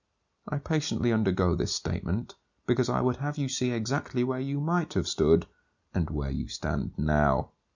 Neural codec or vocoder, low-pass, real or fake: none; 7.2 kHz; real